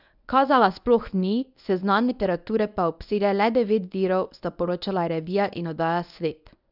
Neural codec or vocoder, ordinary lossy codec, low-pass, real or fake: codec, 24 kHz, 0.9 kbps, WavTokenizer, medium speech release version 1; none; 5.4 kHz; fake